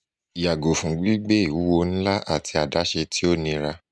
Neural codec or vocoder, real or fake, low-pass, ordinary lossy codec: none; real; none; none